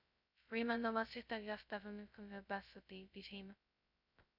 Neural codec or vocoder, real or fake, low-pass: codec, 16 kHz, 0.2 kbps, FocalCodec; fake; 5.4 kHz